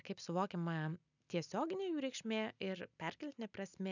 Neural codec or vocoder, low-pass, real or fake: none; 7.2 kHz; real